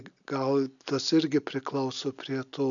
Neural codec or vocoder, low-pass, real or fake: none; 7.2 kHz; real